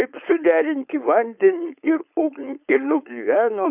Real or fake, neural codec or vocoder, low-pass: fake; codec, 16 kHz, 4.8 kbps, FACodec; 3.6 kHz